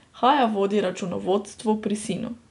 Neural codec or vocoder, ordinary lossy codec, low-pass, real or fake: none; none; 10.8 kHz; real